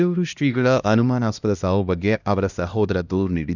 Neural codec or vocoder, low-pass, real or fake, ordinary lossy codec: codec, 16 kHz, 1 kbps, X-Codec, HuBERT features, trained on LibriSpeech; 7.2 kHz; fake; none